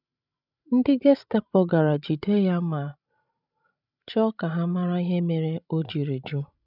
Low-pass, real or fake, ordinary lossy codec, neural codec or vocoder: 5.4 kHz; fake; none; codec, 16 kHz, 16 kbps, FreqCodec, larger model